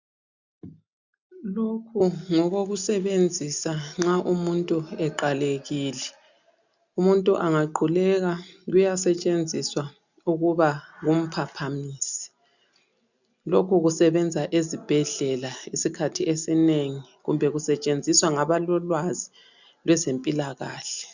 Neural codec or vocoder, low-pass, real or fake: none; 7.2 kHz; real